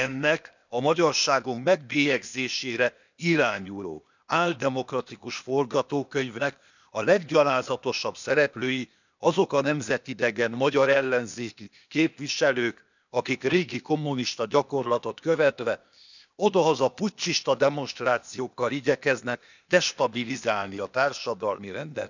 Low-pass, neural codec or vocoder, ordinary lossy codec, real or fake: 7.2 kHz; codec, 16 kHz, 0.8 kbps, ZipCodec; none; fake